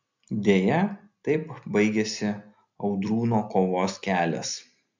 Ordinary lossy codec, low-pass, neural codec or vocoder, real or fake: MP3, 64 kbps; 7.2 kHz; none; real